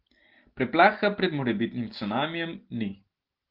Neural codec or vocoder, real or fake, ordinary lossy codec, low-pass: none; real; Opus, 16 kbps; 5.4 kHz